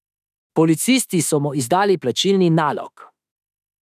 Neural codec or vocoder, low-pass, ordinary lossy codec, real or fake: autoencoder, 48 kHz, 32 numbers a frame, DAC-VAE, trained on Japanese speech; 14.4 kHz; none; fake